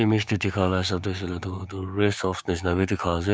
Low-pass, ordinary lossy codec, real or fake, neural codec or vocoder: none; none; fake; codec, 16 kHz, 6 kbps, DAC